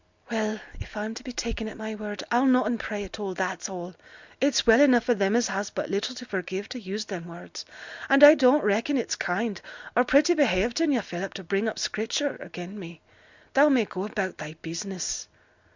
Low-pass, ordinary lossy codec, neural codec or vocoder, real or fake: 7.2 kHz; Opus, 64 kbps; none; real